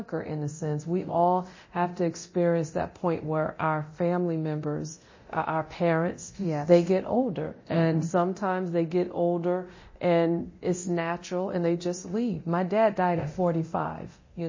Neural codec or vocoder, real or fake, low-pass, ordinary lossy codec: codec, 24 kHz, 0.9 kbps, DualCodec; fake; 7.2 kHz; MP3, 32 kbps